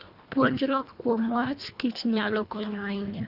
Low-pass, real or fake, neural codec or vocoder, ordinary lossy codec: 5.4 kHz; fake; codec, 24 kHz, 1.5 kbps, HILCodec; Opus, 64 kbps